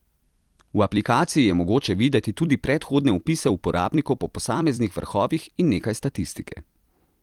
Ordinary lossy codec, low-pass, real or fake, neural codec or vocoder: Opus, 24 kbps; 19.8 kHz; fake; vocoder, 44.1 kHz, 128 mel bands every 256 samples, BigVGAN v2